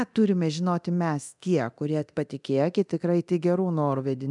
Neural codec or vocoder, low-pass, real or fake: codec, 24 kHz, 0.9 kbps, DualCodec; 10.8 kHz; fake